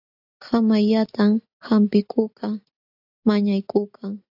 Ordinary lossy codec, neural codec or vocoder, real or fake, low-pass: AAC, 48 kbps; none; real; 5.4 kHz